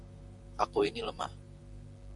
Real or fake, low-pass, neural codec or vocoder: fake; 10.8 kHz; vocoder, 44.1 kHz, 128 mel bands, Pupu-Vocoder